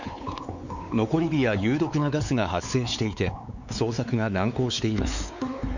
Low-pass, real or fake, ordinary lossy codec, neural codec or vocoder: 7.2 kHz; fake; none; codec, 16 kHz, 4 kbps, X-Codec, WavLM features, trained on Multilingual LibriSpeech